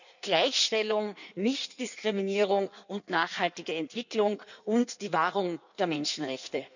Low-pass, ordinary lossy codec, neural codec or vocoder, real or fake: 7.2 kHz; none; codec, 16 kHz in and 24 kHz out, 1.1 kbps, FireRedTTS-2 codec; fake